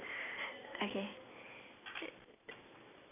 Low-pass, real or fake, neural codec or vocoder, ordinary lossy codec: 3.6 kHz; real; none; AAC, 32 kbps